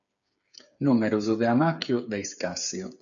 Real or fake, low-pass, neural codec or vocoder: fake; 7.2 kHz; codec, 16 kHz, 8 kbps, FreqCodec, smaller model